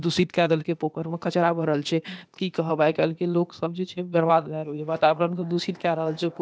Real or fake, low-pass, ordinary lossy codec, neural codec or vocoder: fake; none; none; codec, 16 kHz, 0.8 kbps, ZipCodec